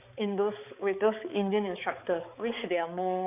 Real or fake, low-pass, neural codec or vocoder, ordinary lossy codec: fake; 3.6 kHz; codec, 16 kHz, 4 kbps, X-Codec, HuBERT features, trained on balanced general audio; none